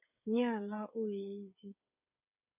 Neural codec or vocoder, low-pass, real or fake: codec, 16 kHz, 8 kbps, FreqCodec, smaller model; 3.6 kHz; fake